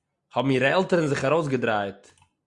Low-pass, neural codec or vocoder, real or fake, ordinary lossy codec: 10.8 kHz; none; real; Opus, 64 kbps